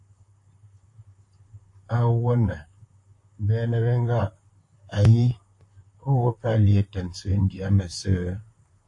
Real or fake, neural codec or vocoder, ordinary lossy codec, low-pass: fake; vocoder, 44.1 kHz, 128 mel bands, Pupu-Vocoder; AAC, 48 kbps; 10.8 kHz